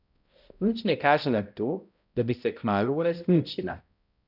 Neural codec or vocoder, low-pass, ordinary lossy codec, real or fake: codec, 16 kHz, 0.5 kbps, X-Codec, HuBERT features, trained on balanced general audio; 5.4 kHz; none; fake